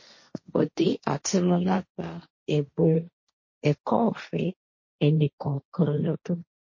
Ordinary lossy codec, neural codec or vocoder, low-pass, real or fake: MP3, 32 kbps; codec, 16 kHz, 1.1 kbps, Voila-Tokenizer; 7.2 kHz; fake